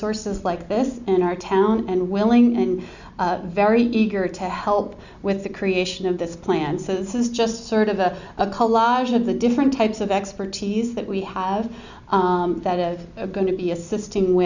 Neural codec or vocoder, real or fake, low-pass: none; real; 7.2 kHz